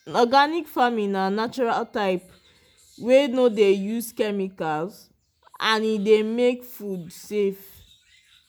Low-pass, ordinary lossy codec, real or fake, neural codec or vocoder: none; none; real; none